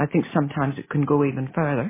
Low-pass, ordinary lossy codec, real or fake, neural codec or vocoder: 3.6 kHz; MP3, 16 kbps; real; none